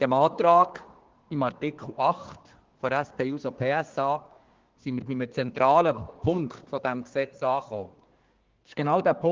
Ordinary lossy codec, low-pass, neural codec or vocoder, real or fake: Opus, 16 kbps; 7.2 kHz; codec, 24 kHz, 1 kbps, SNAC; fake